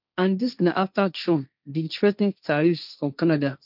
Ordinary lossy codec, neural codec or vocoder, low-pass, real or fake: none; codec, 16 kHz, 1.1 kbps, Voila-Tokenizer; 5.4 kHz; fake